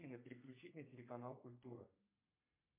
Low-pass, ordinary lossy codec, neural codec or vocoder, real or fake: 3.6 kHz; MP3, 32 kbps; codec, 32 kHz, 1.9 kbps, SNAC; fake